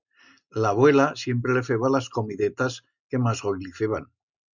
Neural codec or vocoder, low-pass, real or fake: none; 7.2 kHz; real